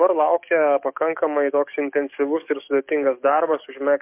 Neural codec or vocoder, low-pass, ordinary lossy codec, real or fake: codec, 44.1 kHz, 7.8 kbps, DAC; 3.6 kHz; MP3, 32 kbps; fake